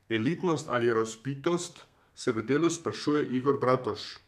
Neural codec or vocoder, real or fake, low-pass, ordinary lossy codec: codec, 32 kHz, 1.9 kbps, SNAC; fake; 14.4 kHz; none